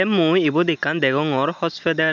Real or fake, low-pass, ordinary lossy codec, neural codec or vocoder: real; 7.2 kHz; none; none